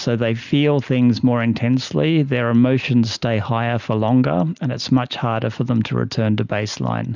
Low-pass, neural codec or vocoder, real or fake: 7.2 kHz; none; real